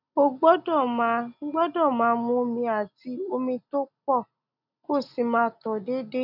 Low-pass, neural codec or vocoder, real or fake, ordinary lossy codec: 5.4 kHz; none; real; none